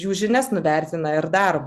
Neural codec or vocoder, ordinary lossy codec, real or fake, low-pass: none; Opus, 64 kbps; real; 14.4 kHz